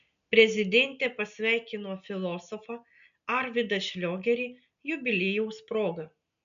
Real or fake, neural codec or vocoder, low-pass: real; none; 7.2 kHz